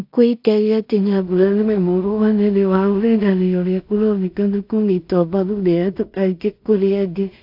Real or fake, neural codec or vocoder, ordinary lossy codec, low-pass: fake; codec, 16 kHz in and 24 kHz out, 0.4 kbps, LongCat-Audio-Codec, two codebook decoder; AAC, 48 kbps; 5.4 kHz